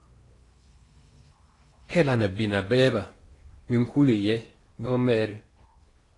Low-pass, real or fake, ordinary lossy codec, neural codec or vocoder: 10.8 kHz; fake; AAC, 32 kbps; codec, 16 kHz in and 24 kHz out, 0.8 kbps, FocalCodec, streaming, 65536 codes